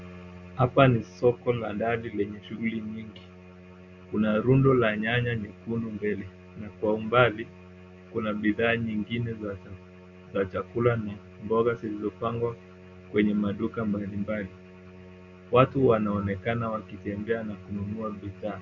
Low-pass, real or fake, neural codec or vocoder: 7.2 kHz; real; none